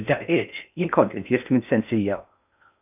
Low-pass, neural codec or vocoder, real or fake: 3.6 kHz; codec, 16 kHz in and 24 kHz out, 0.6 kbps, FocalCodec, streaming, 4096 codes; fake